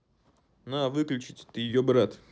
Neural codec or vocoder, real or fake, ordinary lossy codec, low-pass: none; real; none; none